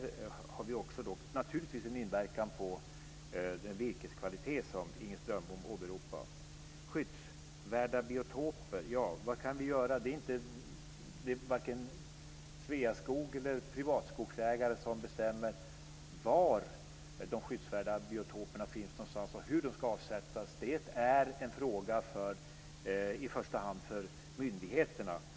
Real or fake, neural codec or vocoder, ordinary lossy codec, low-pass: real; none; none; none